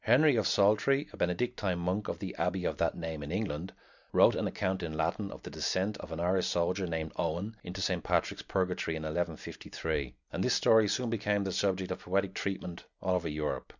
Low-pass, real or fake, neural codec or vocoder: 7.2 kHz; real; none